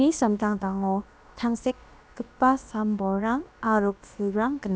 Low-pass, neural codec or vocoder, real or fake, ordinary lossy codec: none; codec, 16 kHz, about 1 kbps, DyCAST, with the encoder's durations; fake; none